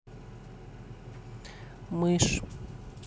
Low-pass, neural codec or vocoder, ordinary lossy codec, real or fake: none; none; none; real